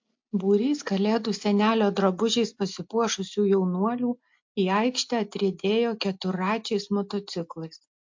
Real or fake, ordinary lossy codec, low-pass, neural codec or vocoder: real; MP3, 48 kbps; 7.2 kHz; none